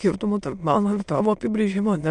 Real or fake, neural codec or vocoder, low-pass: fake; autoencoder, 22.05 kHz, a latent of 192 numbers a frame, VITS, trained on many speakers; 9.9 kHz